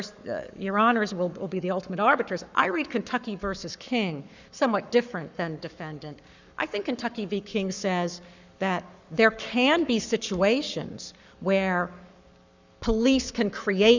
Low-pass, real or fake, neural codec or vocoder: 7.2 kHz; fake; codec, 44.1 kHz, 7.8 kbps, Pupu-Codec